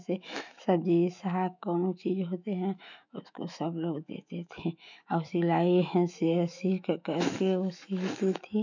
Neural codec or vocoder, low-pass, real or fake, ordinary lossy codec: autoencoder, 48 kHz, 128 numbers a frame, DAC-VAE, trained on Japanese speech; 7.2 kHz; fake; none